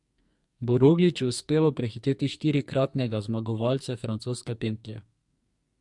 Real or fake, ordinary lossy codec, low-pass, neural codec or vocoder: fake; MP3, 64 kbps; 10.8 kHz; codec, 44.1 kHz, 2.6 kbps, SNAC